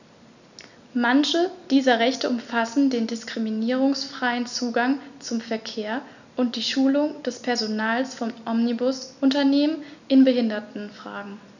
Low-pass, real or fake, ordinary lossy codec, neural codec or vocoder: 7.2 kHz; real; none; none